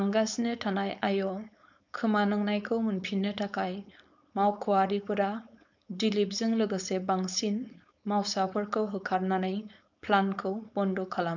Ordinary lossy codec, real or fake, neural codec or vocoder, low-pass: none; fake; codec, 16 kHz, 4.8 kbps, FACodec; 7.2 kHz